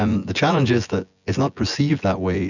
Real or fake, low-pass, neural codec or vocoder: fake; 7.2 kHz; vocoder, 24 kHz, 100 mel bands, Vocos